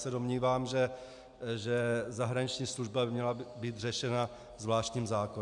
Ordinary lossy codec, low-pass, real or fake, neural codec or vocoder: MP3, 96 kbps; 10.8 kHz; real; none